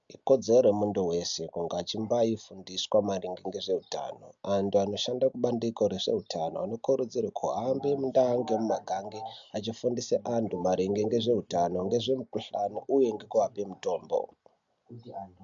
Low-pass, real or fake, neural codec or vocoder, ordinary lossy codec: 7.2 kHz; real; none; MP3, 64 kbps